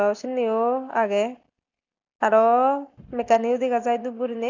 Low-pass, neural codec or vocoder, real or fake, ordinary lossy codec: 7.2 kHz; none; real; none